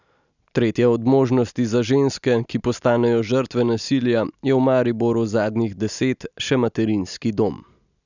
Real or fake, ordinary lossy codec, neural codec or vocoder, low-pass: real; none; none; 7.2 kHz